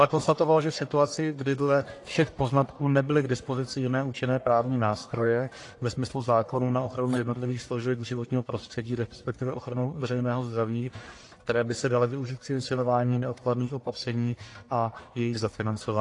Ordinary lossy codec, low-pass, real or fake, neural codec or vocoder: AAC, 48 kbps; 10.8 kHz; fake; codec, 44.1 kHz, 1.7 kbps, Pupu-Codec